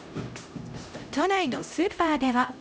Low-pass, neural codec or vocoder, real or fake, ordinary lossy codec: none; codec, 16 kHz, 0.5 kbps, X-Codec, HuBERT features, trained on LibriSpeech; fake; none